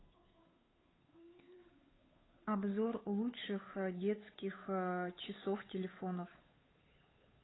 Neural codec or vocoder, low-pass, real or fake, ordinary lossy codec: codec, 16 kHz, 8 kbps, FunCodec, trained on Chinese and English, 25 frames a second; 7.2 kHz; fake; AAC, 16 kbps